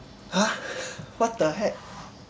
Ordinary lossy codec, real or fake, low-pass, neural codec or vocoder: none; real; none; none